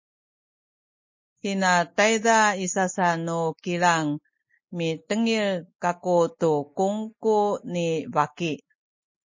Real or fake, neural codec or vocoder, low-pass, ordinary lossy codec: real; none; 7.2 kHz; MP3, 32 kbps